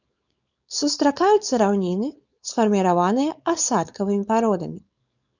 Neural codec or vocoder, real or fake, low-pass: codec, 16 kHz, 4.8 kbps, FACodec; fake; 7.2 kHz